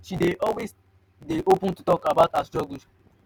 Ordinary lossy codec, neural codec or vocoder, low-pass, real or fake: none; none; none; real